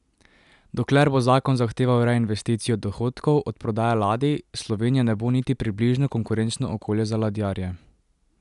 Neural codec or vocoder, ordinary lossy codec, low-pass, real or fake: none; none; 10.8 kHz; real